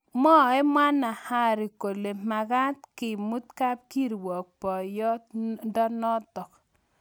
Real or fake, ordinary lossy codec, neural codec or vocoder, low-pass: real; none; none; none